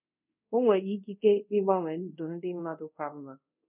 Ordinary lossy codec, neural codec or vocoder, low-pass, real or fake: none; codec, 24 kHz, 0.5 kbps, DualCodec; 3.6 kHz; fake